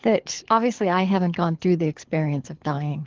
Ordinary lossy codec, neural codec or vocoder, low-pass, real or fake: Opus, 16 kbps; codec, 24 kHz, 6 kbps, HILCodec; 7.2 kHz; fake